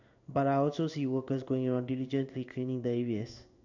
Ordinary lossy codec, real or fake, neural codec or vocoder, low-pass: none; fake; codec, 16 kHz in and 24 kHz out, 1 kbps, XY-Tokenizer; 7.2 kHz